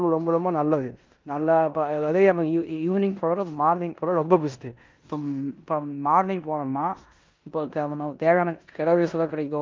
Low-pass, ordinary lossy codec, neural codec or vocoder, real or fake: 7.2 kHz; Opus, 32 kbps; codec, 16 kHz in and 24 kHz out, 0.9 kbps, LongCat-Audio-Codec, four codebook decoder; fake